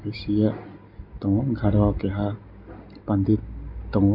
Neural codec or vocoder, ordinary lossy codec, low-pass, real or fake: none; none; 5.4 kHz; real